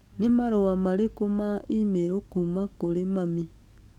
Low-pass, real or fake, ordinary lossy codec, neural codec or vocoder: 19.8 kHz; fake; none; codec, 44.1 kHz, 7.8 kbps, DAC